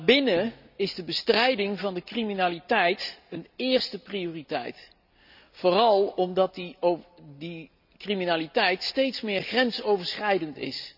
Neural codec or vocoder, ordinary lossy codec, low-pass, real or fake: none; none; 5.4 kHz; real